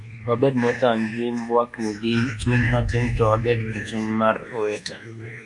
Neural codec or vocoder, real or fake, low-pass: codec, 24 kHz, 1.2 kbps, DualCodec; fake; 10.8 kHz